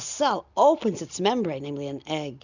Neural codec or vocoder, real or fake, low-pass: none; real; 7.2 kHz